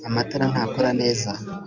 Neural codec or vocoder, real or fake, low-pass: none; real; 7.2 kHz